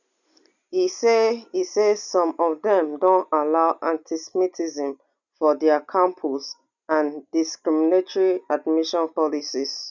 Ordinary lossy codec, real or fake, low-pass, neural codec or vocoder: none; real; 7.2 kHz; none